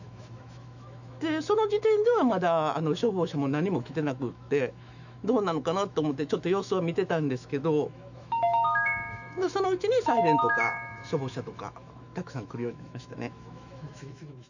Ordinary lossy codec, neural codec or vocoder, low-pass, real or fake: none; autoencoder, 48 kHz, 128 numbers a frame, DAC-VAE, trained on Japanese speech; 7.2 kHz; fake